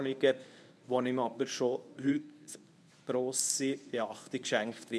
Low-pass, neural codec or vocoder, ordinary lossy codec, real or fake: none; codec, 24 kHz, 0.9 kbps, WavTokenizer, medium speech release version 1; none; fake